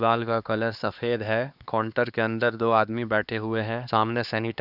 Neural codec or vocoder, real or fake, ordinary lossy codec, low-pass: codec, 16 kHz, 2 kbps, X-Codec, HuBERT features, trained on LibriSpeech; fake; none; 5.4 kHz